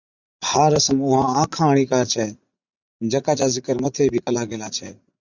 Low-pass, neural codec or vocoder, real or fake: 7.2 kHz; vocoder, 44.1 kHz, 80 mel bands, Vocos; fake